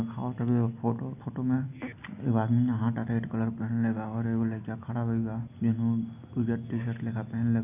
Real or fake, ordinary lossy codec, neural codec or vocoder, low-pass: real; none; none; 3.6 kHz